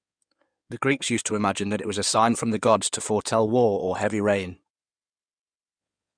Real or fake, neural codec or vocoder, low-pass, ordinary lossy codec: fake; codec, 16 kHz in and 24 kHz out, 2.2 kbps, FireRedTTS-2 codec; 9.9 kHz; Opus, 64 kbps